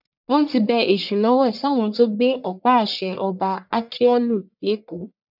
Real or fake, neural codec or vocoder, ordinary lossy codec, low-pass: fake; codec, 44.1 kHz, 1.7 kbps, Pupu-Codec; none; 5.4 kHz